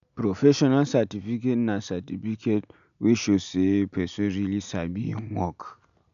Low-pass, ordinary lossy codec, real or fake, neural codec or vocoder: 7.2 kHz; none; real; none